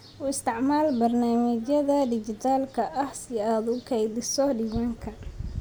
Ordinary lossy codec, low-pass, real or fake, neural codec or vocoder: none; none; real; none